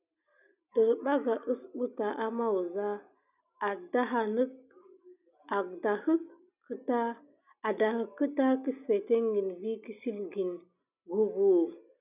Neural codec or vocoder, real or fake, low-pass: none; real; 3.6 kHz